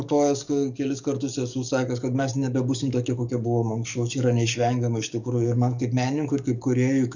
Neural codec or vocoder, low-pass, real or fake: codec, 44.1 kHz, 7.8 kbps, DAC; 7.2 kHz; fake